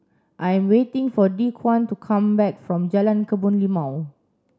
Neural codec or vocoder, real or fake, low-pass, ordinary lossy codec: none; real; none; none